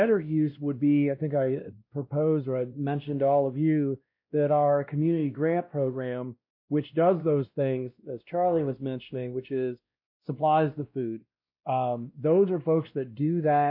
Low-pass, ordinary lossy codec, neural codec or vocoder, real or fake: 5.4 kHz; MP3, 32 kbps; codec, 16 kHz, 1 kbps, X-Codec, WavLM features, trained on Multilingual LibriSpeech; fake